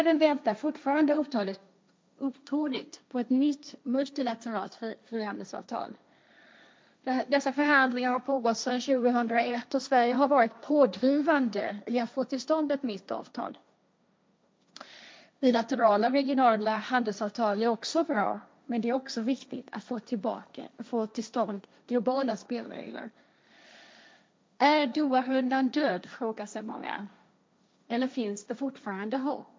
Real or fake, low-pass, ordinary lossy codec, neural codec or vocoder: fake; none; none; codec, 16 kHz, 1.1 kbps, Voila-Tokenizer